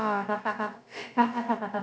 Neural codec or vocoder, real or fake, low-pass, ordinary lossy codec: codec, 16 kHz, about 1 kbps, DyCAST, with the encoder's durations; fake; none; none